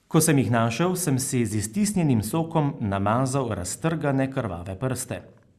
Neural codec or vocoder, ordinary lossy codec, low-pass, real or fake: none; Opus, 64 kbps; 14.4 kHz; real